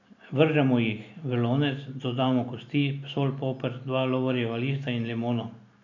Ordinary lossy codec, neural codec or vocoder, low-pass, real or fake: none; none; 7.2 kHz; real